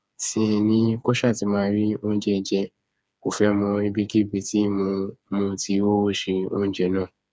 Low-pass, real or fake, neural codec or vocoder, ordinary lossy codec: none; fake; codec, 16 kHz, 4 kbps, FreqCodec, smaller model; none